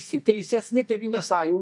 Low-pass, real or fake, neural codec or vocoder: 10.8 kHz; fake; codec, 24 kHz, 0.9 kbps, WavTokenizer, medium music audio release